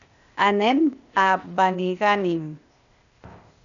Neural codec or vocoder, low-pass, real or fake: codec, 16 kHz, 0.8 kbps, ZipCodec; 7.2 kHz; fake